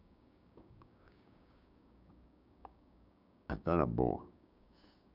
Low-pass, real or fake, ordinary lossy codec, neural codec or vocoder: 5.4 kHz; fake; none; codec, 16 kHz, 6 kbps, DAC